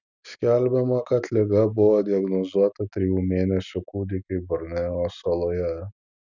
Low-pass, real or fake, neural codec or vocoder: 7.2 kHz; real; none